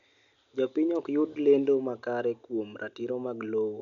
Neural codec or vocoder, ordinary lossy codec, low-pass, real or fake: none; none; 7.2 kHz; real